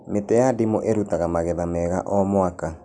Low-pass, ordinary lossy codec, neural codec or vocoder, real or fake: 9.9 kHz; none; none; real